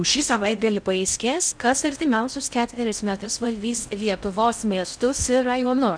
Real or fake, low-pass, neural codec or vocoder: fake; 9.9 kHz; codec, 16 kHz in and 24 kHz out, 0.6 kbps, FocalCodec, streaming, 2048 codes